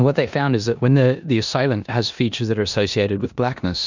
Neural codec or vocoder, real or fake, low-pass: codec, 16 kHz in and 24 kHz out, 0.9 kbps, LongCat-Audio-Codec, four codebook decoder; fake; 7.2 kHz